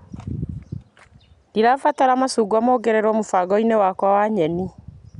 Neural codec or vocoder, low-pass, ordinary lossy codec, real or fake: none; 10.8 kHz; none; real